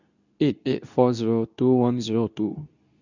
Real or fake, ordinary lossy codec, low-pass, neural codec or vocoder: fake; none; 7.2 kHz; codec, 24 kHz, 0.9 kbps, WavTokenizer, medium speech release version 2